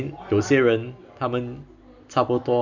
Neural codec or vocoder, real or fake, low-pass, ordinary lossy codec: none; real; 7.2 kHz; none